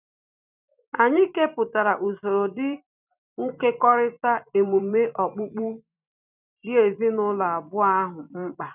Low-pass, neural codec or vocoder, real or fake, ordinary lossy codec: 3.6 kHz; vocoder, 44.1 kHz, 128 mel bands every 256 samples, BigVGAN v2; fake; none